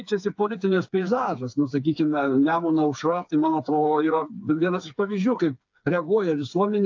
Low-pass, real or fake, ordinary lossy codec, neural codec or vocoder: 7.2 kHz; fake; AAC, 48 kbps; codec, 16 kHz, 4 kbps, FreqCodec, smaller model